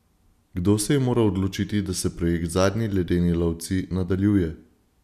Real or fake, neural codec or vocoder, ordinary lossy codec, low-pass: real; none; none; 14.4 kHz